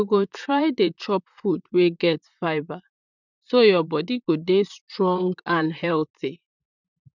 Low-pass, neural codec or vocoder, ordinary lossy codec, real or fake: 7.2 kHz; vocoder, 44.1 kHz, 128 mel bands, Pupu-Vocoder; none; fake